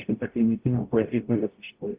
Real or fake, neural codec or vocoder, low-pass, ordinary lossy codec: fake; codec, 44.1 kHz, 0.9 kbps, DAC; 3.6 kHz; Opus, 32 kbps